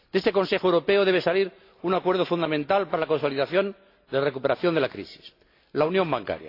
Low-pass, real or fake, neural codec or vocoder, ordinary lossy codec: 5.4 kHz; real; none; AAC, 32 kbps